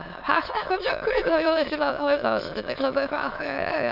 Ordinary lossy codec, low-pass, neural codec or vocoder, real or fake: none; 5.4 kHz; autoencoder, 22.05 kHz, a latent of 192 numbers a frame, VITS, trained on many speakers; fake